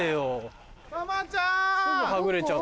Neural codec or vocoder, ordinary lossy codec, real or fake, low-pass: none; none; real; none